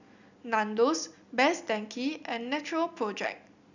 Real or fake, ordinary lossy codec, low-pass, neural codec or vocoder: real; none; 7.2 kHz; none